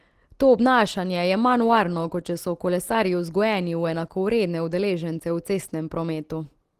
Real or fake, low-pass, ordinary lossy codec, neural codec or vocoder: real; 14.4 kHz; Opus, 16 kbps; none